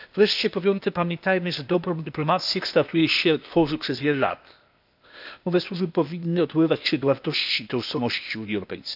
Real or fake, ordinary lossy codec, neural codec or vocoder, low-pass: fake; none; codec, 16 kHz, 0.8 kbps, ZipCodec; 5.4 kHz